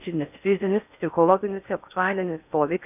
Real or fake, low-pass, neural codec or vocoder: fake; 3.6 kHz; codec, 16 kHz in and 24 kHz out, 0.6 kbps, FocalCodec, streaming, 4096 codes